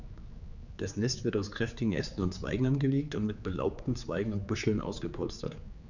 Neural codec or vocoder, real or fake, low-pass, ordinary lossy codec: codec, 16 kHz, 4 kbps, X-Codec, HuBERT features, trained on general audio; fake; 7.2 kHz; none